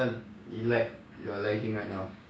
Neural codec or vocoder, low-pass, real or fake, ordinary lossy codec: codec, 16 kHz, 6 kbps, DAC; none; fake; none